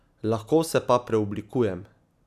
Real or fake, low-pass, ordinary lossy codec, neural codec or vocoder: real; 14.4 kHz; none; none